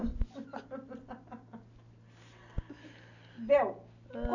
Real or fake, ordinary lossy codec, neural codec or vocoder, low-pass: real; AAC, 48 kbps; none; 7.2 kHz